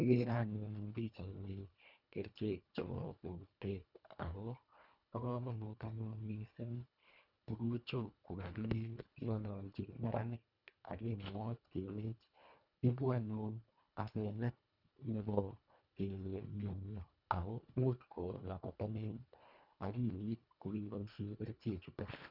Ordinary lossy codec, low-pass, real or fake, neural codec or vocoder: none; 5.4 kHz; fake; codec, 24 kHz, 1.5 kbps, HILCodec